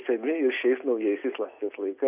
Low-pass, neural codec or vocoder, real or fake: 3.6 kHz; none; real